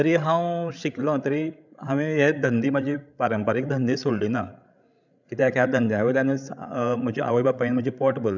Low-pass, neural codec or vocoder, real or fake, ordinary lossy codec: 7.2 kHz; codec, 16 kHz, 16 kbps, FreqCodec, larger model; fake; none